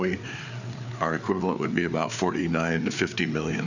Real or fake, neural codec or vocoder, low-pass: fake; codec, 16 kHz, 4 kbps, FreqCodec, larger model; 7.2 kHz